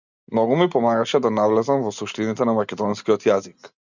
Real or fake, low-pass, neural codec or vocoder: real; 7.2 kHz; none